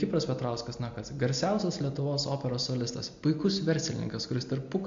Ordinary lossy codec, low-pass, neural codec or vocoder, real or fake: MP3, 48 kbps; 7.2 kHz; none; real